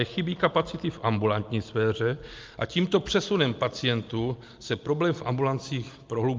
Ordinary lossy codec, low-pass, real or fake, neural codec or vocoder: Opus, 24 kbps; 7.2 kHz; real; none